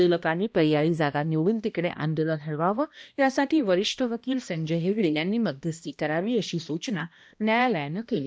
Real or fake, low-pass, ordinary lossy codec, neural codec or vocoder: fake; none; none; codec, 16 kHz, 1 kbps, X-Codec, HuBERT features, trained on balanced general audio